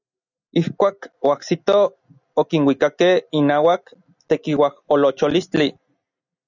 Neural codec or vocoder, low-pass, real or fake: none; 7.2 kHz; real